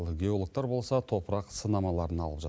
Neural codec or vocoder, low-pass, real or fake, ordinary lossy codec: none; none; real; none